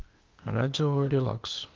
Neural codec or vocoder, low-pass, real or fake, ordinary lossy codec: codec, 16 kHz, 0.8 kbps, ZipCodec; 7.2 kHz; fake; Opus, 24 kbps